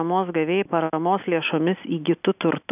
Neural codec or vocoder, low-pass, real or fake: none; 3.6 kHz; real